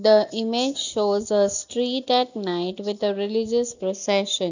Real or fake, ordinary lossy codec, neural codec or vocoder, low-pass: real; none; none; 7.2 kHz